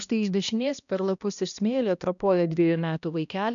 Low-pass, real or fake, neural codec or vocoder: 7.2 kHz; fake; codec, 16 kHz, 1 kbps, X-Codec, HuBERT features, trained on balanced general audio